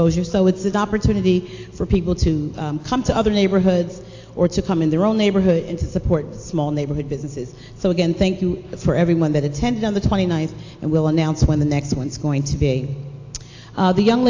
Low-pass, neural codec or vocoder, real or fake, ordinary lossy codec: 7.2 kHz; none; real; AAC, 48 kbps